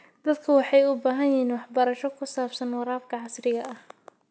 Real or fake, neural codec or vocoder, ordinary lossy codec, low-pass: real; none; none; none